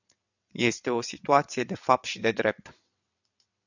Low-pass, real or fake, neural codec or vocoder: 7.2 kHz; fake; vocoder, 22.05 kHz, 80 mel bands, WaveNeXt